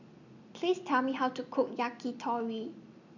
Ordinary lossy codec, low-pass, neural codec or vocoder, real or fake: none; 7.2 kHz; none; real